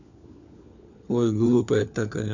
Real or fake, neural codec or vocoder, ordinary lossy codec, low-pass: fake; codec, 16 kHz, 4 kbps, FunCodec, trained on LibriTTS, 50 frames a second; none; 7.2 kHz